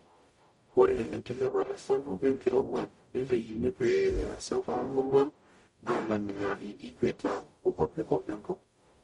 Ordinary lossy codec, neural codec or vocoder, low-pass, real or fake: MP3, 48 kbps; codec, 44.1 kHz, 0.9 kbps, DAC; 19.8 kHz; fake